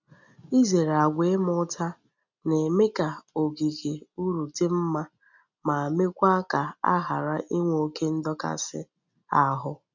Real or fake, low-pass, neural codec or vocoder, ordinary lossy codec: real; 7.2 kHz; none; none